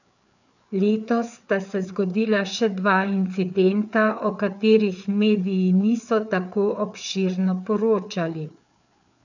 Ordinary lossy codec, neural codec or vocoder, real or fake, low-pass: none; codec, 16 kHz, 4 kbps, FreqCodec, larger model; fake; 7.2 kHz